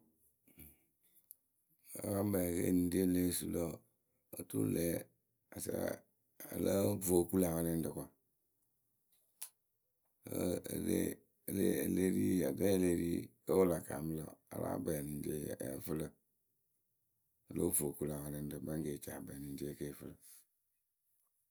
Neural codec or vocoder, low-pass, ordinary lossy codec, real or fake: none; none; none; real